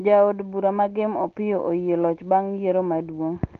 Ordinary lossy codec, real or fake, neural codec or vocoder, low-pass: Opus, 16 kbps; real; none; 7.2 kHz